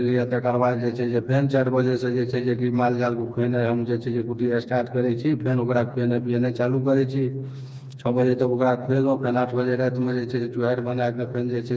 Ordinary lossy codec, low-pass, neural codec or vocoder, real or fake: none; none; codec, 16 kHz, 2 kbps, FreqCodec, smaller model; fake